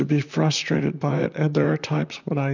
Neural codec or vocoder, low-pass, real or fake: vocoder, 44.1 kHz, 128 mel bands, Pupu-Vocoder; 7.2 kHz; fake